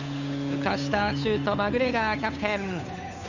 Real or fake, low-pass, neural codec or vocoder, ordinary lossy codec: fake; 7.2 kHz; codec, 16 kHz, 8 kbps, FunCodec, trained on Chinese and English, 25 frames a second; none